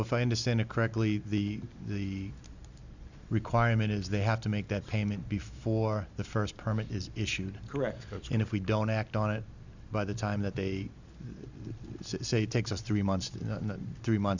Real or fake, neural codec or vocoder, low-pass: real; none; 7.2 kHz